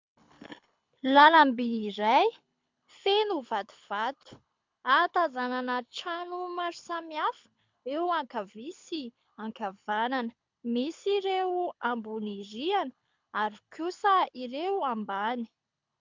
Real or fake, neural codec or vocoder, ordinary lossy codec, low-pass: fake; codec, 24 kHz, 6 kbps, HILCodec; MP3, 64 kbps; 7.2 kHz